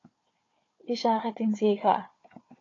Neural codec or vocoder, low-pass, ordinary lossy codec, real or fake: codec, 16 kHz, 4 kbps, FunCodec, trained on Chinese and English, 50 frames a second; 7.2 kHz; AAC, 32 kbps; fake